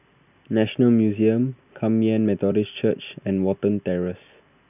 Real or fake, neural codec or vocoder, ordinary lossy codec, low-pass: real; none; none; 3.6 kHz